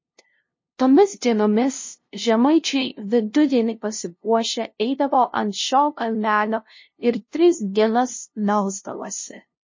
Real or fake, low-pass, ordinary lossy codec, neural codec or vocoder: fake; 7.2 kHz; MP3, 32 kbps; codec, 16 kHz, 0.5 kbps, FunCodec, trained on LibriTTS, 25 frames a second